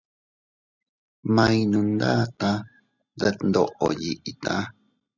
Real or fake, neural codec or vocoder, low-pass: real; none; 7.2 kHz